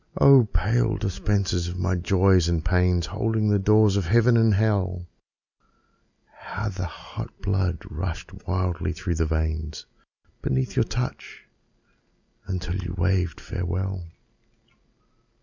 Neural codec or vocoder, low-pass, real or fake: none; 7.2 kHz; real